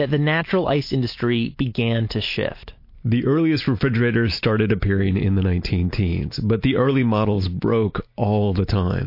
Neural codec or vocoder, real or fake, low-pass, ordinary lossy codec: none; real; 5.4 kHz; MP3, 32 kbps